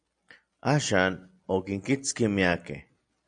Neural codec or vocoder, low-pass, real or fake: none; 9.9 kHz; real